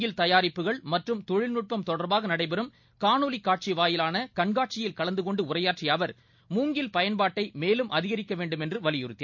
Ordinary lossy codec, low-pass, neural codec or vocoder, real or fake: MP3, 48 kbps; 7.2 kHz; none; real